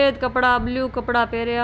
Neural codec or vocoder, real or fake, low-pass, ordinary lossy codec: none; real; none; none